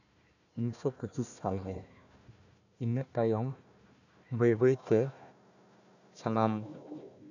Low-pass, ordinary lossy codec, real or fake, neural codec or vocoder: 7.2 kHz; none; fake; codec, 16 kHz, 1 kbps, FunCodec, trained on Chinese and English, 50 frames a second